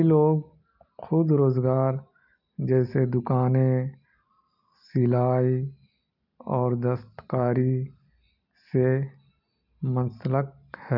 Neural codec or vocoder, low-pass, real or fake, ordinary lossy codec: none; 5.4 kHz; real; none